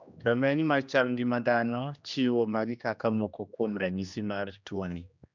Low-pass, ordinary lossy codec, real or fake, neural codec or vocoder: 7.2 kHz; none; fake; codec, 16 kHz, 1 kbps, X-Codec, HuBERT features, trained on general audio